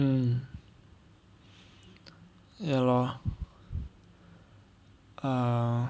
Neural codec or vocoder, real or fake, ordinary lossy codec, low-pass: none; real; none; none